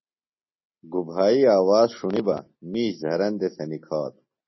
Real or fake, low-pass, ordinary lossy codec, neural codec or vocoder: real; 7.2 kHz; MP3, 24 kbps; none